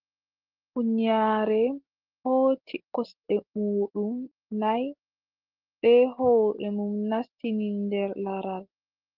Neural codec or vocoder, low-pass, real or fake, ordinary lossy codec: none; 5.4 kHz; real; Opus, 16 kbps